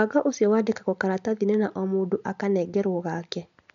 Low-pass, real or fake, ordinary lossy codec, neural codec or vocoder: 7.2 kHz; real; MP3, 64 kbps; none